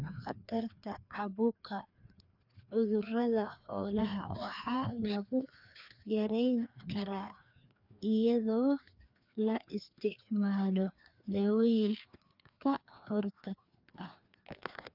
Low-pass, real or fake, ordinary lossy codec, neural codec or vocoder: 5.4 kHz; fake; none; codec, 16 kHz, 2 kbps, FreqCodec, larger model